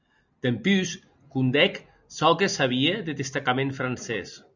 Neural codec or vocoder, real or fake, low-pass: none; real; 7.2 kHz